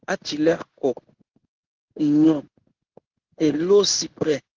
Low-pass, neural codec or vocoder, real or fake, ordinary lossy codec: 7.2 kHz; codec, 16 kHz in and 24 kHz out, 1 kbps, XY-Tokenizer; fake; Opus, 16 kbps